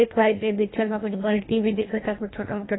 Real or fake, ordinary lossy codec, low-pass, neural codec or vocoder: fake; AAC, 16 kbps; 7.2 kHz; codec, 24 kHz, 1.5 kbps, HILCodec